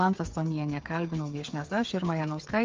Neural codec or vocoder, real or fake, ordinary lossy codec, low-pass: codec, 16 kHz, 8 kbps, FreqCodec, smaller model; fake; Opus, 32 kbps; 7.2 kHz